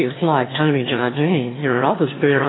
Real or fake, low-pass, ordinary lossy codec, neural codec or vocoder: fake; 7.2 kHz; AAC, 16 kbps; autoencoder, 22.05 kHz, a latent of 192 numbers a frame, VITS, trained on one speaker